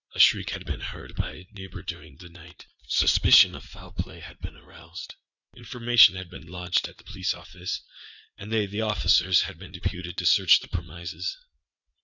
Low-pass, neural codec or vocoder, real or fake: 7.2 kHz; vocoder, 44.1 kHz, 80 mel bands, Vocos; fake